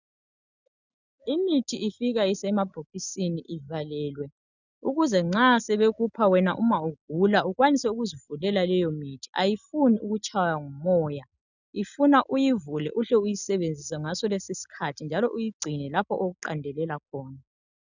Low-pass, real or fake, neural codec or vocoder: 7.2 kHz; real; none